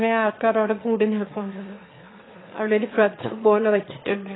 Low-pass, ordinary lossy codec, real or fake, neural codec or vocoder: 7.2 kHz; AAC, 16 kbps; fake; autoencoder, 22.05 kHz, a latent of 192 numbers a frame, VITS, trained on one speaker